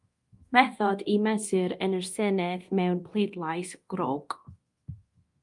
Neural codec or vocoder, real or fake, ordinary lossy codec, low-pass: codec, 24 kHz, 0.9 kbps, DualCodec; fake; Opus, 32 kbps; 10.8 kHz